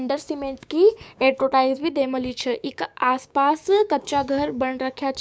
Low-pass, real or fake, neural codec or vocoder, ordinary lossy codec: none; fake; codec, 16 kHz, 6 kbps, DAC; none